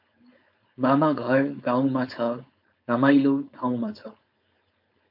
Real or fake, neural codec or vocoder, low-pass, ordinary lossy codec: fake; codec, 16 kHz, 4.8 kbps, FACodec; 5.4 kHz; MP3, 48 kbps